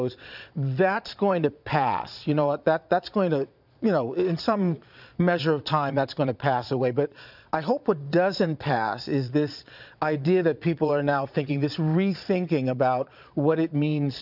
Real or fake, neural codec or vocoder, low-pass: fake; vocoder, 22.05 kHz, 80 mel bands, Vocos; 5.4 kHz